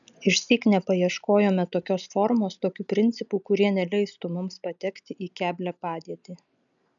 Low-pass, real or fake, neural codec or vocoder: 7.2 kHz; real; none